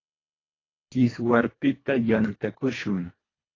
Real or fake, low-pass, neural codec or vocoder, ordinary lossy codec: fake; 7.2 kHz; codec, 24 kHz, 1.5 kbps, HILCodec; AAC, 32 kbps